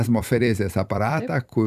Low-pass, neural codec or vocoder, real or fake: 14.4 kHz; vocoder, 44.1 kHz, 128 mel bands every 256 samples, BigVGAN v2; fake